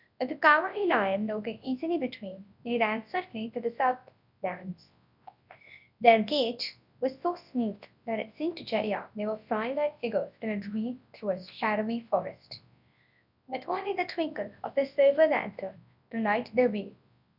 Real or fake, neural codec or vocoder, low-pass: fake; codec, 24 kHz, 0.9 kbps, WavTokenizer, large speech release; 5.4 kHz